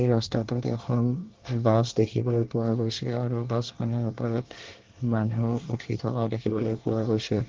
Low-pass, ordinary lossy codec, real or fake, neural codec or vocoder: 7.2 kHz; Opus, 16 kbps; fake; codec, 24 kHz, 1 kbps, SNAC